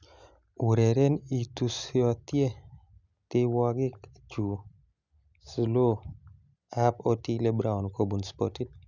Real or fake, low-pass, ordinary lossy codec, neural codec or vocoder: real; 7.2 kHz; none; none